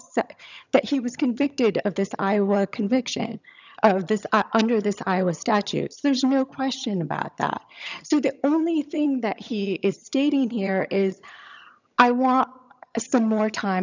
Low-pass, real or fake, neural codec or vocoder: 7.2 kHz; fake; vocoder, 22.05 kHz, 80 mel bands, HiFi-GAN